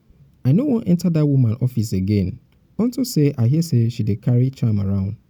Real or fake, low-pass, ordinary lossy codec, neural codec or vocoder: real; 19.8 kHz; none; none